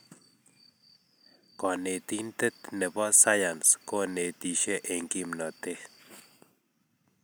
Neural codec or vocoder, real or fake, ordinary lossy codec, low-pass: none; real; none; none